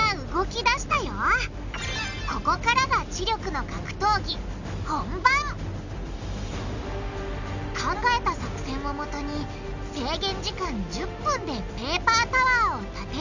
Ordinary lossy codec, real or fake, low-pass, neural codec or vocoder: none; real; 7.2 kHz; none